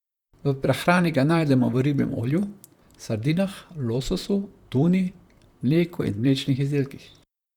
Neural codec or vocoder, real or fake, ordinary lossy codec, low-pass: vocoder, 44.1 kHz, 128 mel bands, Pupu-Vocoder; fake; Opus, 64 kbps; 19.8 kHz